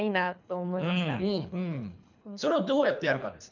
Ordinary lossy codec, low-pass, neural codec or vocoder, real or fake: none; 7.2 kHz; codec, 24 kHz, 3 kbps, HILCodec; fake